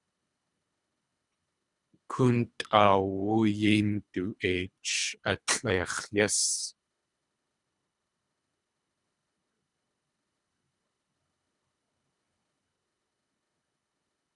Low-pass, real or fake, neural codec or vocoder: 10.8 kHz; fake; codec, 24 kHz, 3 kbps, HILCodec